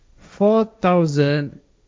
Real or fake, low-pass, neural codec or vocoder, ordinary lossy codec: fake; none; codec, 16 kHz, 1.1 kbps, Voila-Tokenizer; none